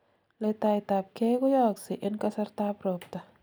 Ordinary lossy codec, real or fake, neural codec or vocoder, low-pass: none; real; none; none